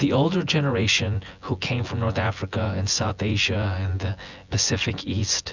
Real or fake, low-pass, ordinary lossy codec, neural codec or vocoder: fake; 7.2 kHz; Opus, 64 kbps; vocoder, 24 kHz, 100 mel bands, Vocos